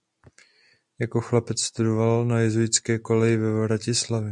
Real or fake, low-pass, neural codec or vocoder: real; 10.8 kHz; none